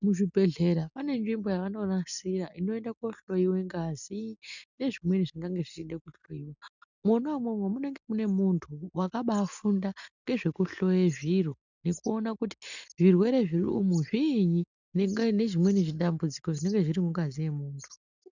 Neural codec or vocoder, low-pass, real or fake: none; 7.2 kHz; real